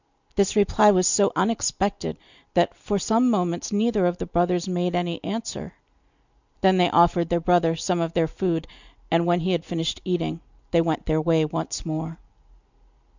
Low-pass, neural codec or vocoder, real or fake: 7.2 kHz; none; real